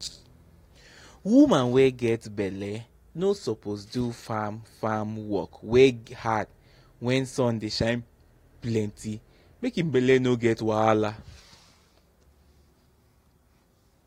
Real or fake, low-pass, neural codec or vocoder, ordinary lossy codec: real; 19.8 kHz; none; AAC, 48 kbps